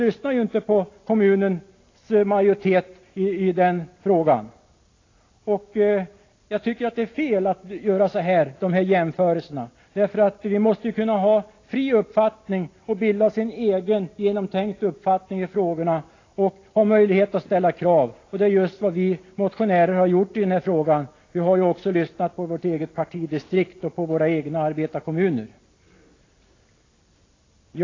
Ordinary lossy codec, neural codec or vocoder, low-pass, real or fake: AAC, 32 kbps; none; 7.2 kHz; real